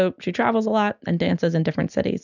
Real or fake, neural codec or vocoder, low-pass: real; none; 7.2 kHz